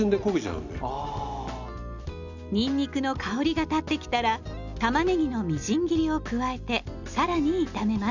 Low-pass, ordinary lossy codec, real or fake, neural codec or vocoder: 7.2 kHz; none; real; none